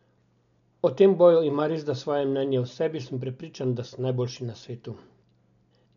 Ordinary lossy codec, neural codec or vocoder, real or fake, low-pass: none; none; real; 7.2 kHz